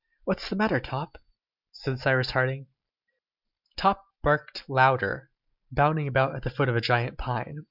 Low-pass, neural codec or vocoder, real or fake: 5.4 kHz; none; real